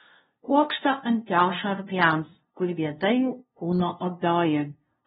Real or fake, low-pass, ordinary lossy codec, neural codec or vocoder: fake; 7.2 kHz; AAC, 16 kbps; codec, 16 kHz, 0.5 kbps, FunCodec, trained on LibriTTS, 25 frames a second